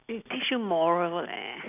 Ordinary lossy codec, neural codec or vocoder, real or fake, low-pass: none; none; real; 3.6 kHz